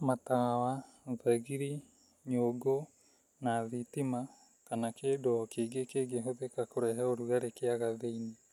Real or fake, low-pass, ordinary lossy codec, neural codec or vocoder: real; 19.8 kHz; none; none